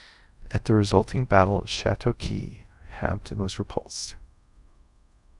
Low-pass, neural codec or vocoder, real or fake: 10.8 kHz; codec, 24 kHz, 0.5 kbps, DualCodec; fake